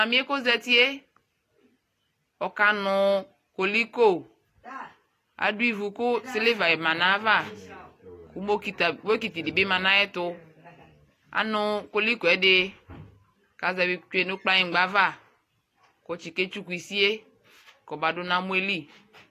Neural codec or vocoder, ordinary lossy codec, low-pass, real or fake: none; AAC, 48 kbps; 14.4 kHz; real